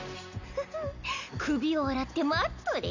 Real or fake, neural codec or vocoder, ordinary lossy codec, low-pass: real; none; none; 7.2 kHz